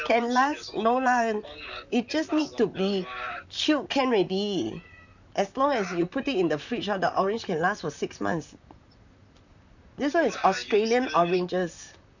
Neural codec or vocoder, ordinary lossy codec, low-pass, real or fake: vocoder, 44.1 kHz, 128 mel bands, Pupu-Vocoder; none; 7.2 kHz; fake